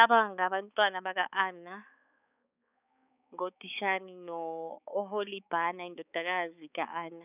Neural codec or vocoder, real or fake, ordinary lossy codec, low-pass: codec, 16 kHz, 4 kbps, X-Codec, HuBERT features, trained on balanced general audio; fake; none; 3.6 kHz